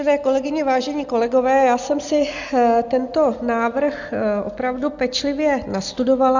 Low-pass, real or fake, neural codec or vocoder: 7.2 kHz; real; none